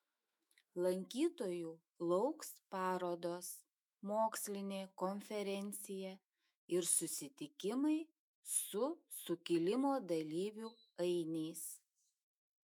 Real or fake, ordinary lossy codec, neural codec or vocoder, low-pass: fake; MP3, 96 kbps; autoencoder, 48 kHz, 128 numbers a frame, DAC-VAE, trained on Japanese speech; 19.8 kHz